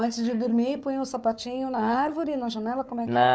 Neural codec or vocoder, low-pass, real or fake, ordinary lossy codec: codec, 16 kHz, 4 kbps, FunCodec, trained on Chinese and English, 50 frames a second; none; fake; none